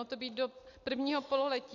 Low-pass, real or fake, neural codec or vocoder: 7.2 kHz; real; none